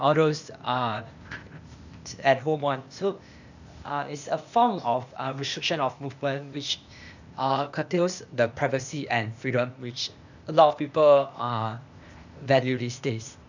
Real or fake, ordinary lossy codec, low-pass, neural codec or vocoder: fake; none; 7.2 kHz; codec, 16 kHz, 0.8 kbps, ZipCodec